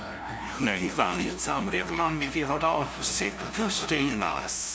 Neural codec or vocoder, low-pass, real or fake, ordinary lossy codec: codec, 16 kHz, 0.5 kbps, FunCodec, trained on LibriTTS, 25 frames a second; none; fake; none